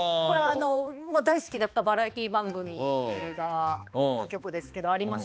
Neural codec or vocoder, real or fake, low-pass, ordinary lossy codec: codec, 16 kHz, 2 kbps, X-Codec, HuBERT features, trained on balanced general audio; fake; none; none